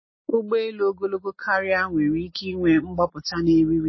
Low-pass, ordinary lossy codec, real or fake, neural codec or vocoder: 7.2 kHz; MP3, 24 kbps; real; none